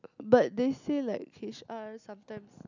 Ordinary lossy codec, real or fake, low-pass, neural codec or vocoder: none; real; 7.2 kHz; none